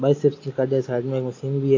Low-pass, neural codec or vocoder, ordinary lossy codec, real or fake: 7.2 kHz; none; AAC, 32 kbps; real